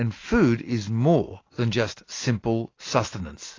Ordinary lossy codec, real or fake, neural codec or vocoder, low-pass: AAC, 32 kbps; real; none; 7.2 kHz